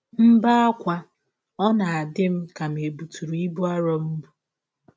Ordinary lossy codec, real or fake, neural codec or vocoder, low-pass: none; real; none; none